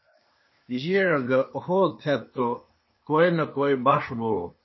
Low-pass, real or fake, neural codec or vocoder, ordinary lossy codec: 7.2 kHz; fake; codec, 16 kHz, 0.8 kbps, ZipCodec; MP3, 24 kbps